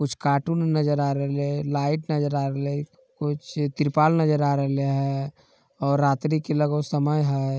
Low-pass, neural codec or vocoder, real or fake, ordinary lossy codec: none; none; real; none